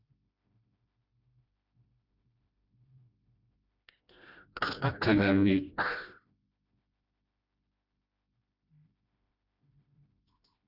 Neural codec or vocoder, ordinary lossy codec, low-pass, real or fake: codec, 16 kHz, 1 kbps, FreqCodec, smaller model; Opus, 64 kbps; 5.4 kHz; fake